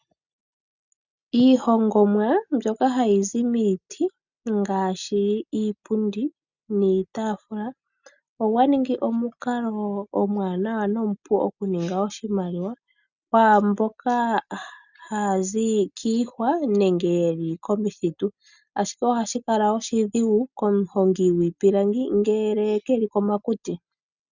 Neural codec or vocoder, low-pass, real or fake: none; 7.2 kHz; real